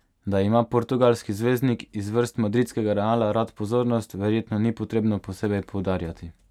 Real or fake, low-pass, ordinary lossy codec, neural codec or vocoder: real; 19.8 kHz; none; none